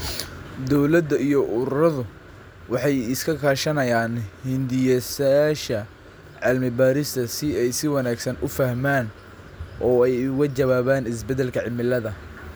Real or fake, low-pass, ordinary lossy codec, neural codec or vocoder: real; none; none; none